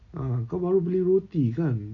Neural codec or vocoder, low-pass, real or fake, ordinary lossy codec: none; 7.2 kHz; real; none